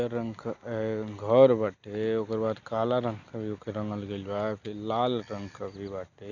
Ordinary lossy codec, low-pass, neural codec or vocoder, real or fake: Opus, 64 kbps; 7.2 kHz; none; real